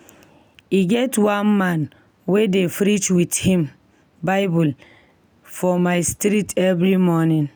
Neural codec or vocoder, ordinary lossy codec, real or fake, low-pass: none; none; real; none